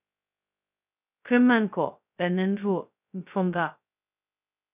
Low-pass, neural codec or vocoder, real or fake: 3.6 kHz; codec, 16 kHz, 0.2 kbps, FocalCodec; fake